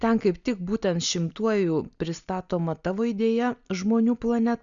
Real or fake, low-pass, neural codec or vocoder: real; 7.2 kHz; none